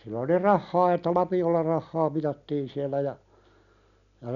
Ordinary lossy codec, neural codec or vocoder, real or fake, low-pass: none; none; real; 7.2 kHz